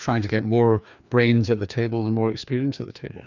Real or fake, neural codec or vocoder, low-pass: fake; codec, 16 kHz, 2 kbps, FreqCodec, larger model; 7.2 kHz